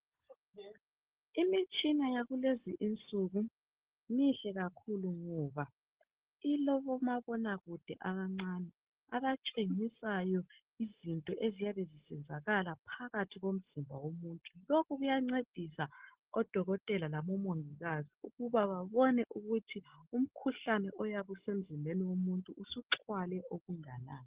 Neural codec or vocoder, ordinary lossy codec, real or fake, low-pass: none; Opus, 16 kbps; real; 3.6 kHz